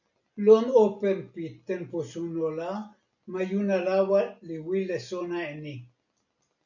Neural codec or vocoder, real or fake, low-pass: none; real; 7.2 kHz